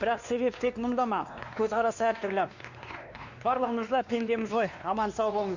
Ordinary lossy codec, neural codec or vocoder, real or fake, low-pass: Opus, 64 kbps; codec, 16 kHz, 2 kbps, X-Codec, WavLM features, trained on Multilingual LibriSpeech; fake; 7.2 kHz